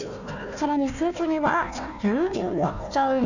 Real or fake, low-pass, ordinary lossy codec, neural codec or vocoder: fake; 7.2 kHz; none; codec, 16 kHz, 1 kbps, FunCodec, trained on Chinese and English, 50 frames a second